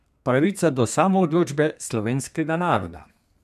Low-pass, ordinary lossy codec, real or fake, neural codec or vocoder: 14.4 kHz; none; fake; codec, 32 kHz, 1.9 kbps, SNAC